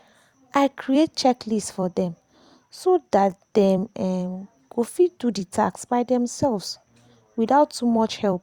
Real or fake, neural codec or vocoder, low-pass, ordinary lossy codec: fake; vocoder, 44.1 kHz, 128 mel bands every 256 samples, BigVGAN v2; 19.8 kHz; Opus, 64 kbps